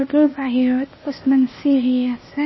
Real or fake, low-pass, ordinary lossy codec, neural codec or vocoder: fake; 7.2 kHz; MP3, 24 kbps; codec, 16 kHz, 0.8 kbps, ZipCodec